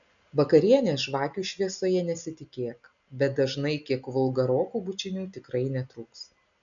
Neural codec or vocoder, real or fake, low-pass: none; real; 7.2 kHz